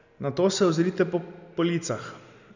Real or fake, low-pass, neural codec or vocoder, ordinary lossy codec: real; 7.2 kHz; none; none